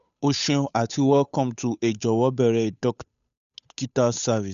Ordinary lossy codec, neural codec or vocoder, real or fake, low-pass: none; codec, 16 kHz, 8 kbps, FunCodec, trained on Chinese and English, 25 frames a second; fake; 7.2 kHz